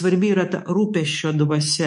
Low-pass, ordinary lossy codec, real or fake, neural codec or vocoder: 10.8 kHz; MP3, 48 kbps; fake; codec, 24 kHz, 3.1 kbps, DualCodec